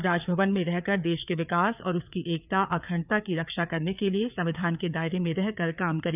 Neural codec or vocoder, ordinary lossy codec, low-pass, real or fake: codec, 16 kHz, 4 kbps, FreqCodec, larger model; none; 3.6 kHz; fake